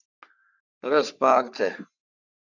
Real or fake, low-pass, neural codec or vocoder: fake; 7.2 kHz; codec, 44.1 kHz, 3.4 kbps, Pupu-Codec